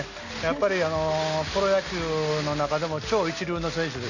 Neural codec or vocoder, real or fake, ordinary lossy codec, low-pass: none; real; none; 7.2 kHz